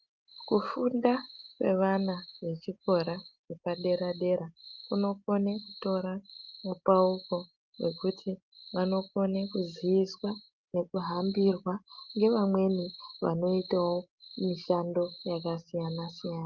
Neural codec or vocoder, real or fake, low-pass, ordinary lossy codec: none; real; 7.2 kHz; Opus, 32 kbps